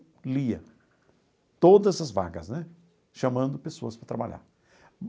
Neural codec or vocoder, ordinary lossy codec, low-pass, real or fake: none; none; none; real